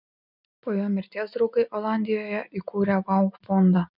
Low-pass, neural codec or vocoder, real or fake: 5.4 kHz; none; real